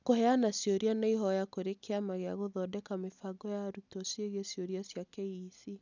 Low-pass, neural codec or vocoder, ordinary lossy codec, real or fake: 7.2 kHz; none; none; real